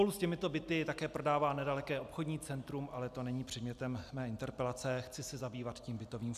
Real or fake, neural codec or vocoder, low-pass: real; none; 14.4 kHz